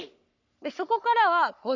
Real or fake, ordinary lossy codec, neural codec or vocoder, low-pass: fake; none; codec, 44.1 kHz, 3.4 kbps, Pupu-Codec; 7.2 kHz